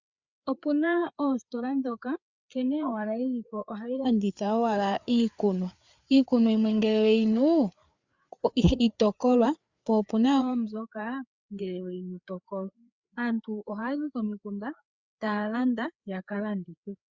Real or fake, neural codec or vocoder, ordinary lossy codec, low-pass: fake; codec, 16 kHz, 4 kbps, FreqCodec, larger model; Opus, 64 kbps; 7.2 kHz